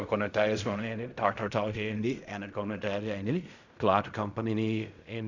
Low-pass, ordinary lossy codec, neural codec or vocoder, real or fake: 7.2 kHz; none; codec, 16 kHz in and 24 kHz out, 0.4 kbps, LongCat-Audio-Codec, fine tuned four codebook decoder; fake